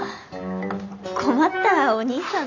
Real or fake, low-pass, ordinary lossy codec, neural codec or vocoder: real; 7.2 kHz; none; none